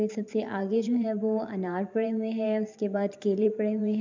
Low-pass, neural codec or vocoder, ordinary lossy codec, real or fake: 7.2 kHz; vocoder, 44.1 kHz, 128 mel bands every 512 samples, BigVGAN v2; MP3, 64 kbps; fake